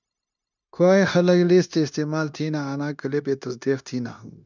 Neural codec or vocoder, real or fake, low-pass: codec, 16 kHz, 0.9 kbps, LongCat-Audio-Codec; fake; 7.2 kHz